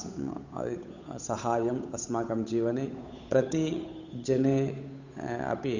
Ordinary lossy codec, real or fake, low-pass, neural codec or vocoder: none; fake; 7.2 kHz; codec, 16 kHz, 8 kbps, FunCodec, trained on Chinese and English, 25 frames a second